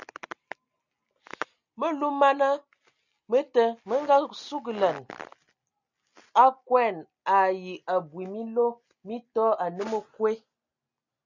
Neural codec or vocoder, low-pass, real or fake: none; 7.2 kHz; real